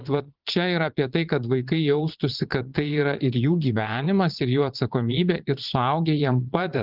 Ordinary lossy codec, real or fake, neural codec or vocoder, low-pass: Opus, 32 kbps; fake; vocoder, 22.05 kHz, 80 mel bands, Vocos; 5.4 kHz